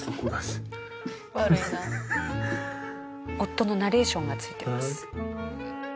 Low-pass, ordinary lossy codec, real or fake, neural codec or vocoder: none; none; real; none